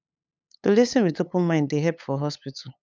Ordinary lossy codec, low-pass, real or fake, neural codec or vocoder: none; none; fake; codec, 16 kHz, 8 kbps, FunCodec, trained on LibriTTS, 25 frames a second